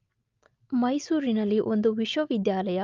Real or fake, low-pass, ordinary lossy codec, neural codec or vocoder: real; 7.2 kHz; Opus, 32 kbps; none